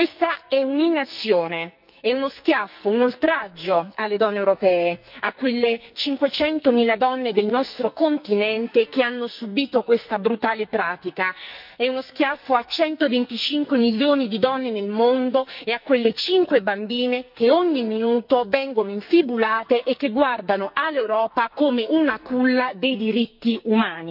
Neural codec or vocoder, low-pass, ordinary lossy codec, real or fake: codec, 44.1 kHz, 2.6 kbps, SNAC; 5.4 kHz; none; fake